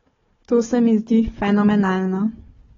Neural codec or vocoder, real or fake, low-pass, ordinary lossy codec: codec, 16 kHz, 4 kbps, FunCodec, trained on Chinese and English, 50 frames a second; fake; 7.2 kHz; AAC, 24 kbps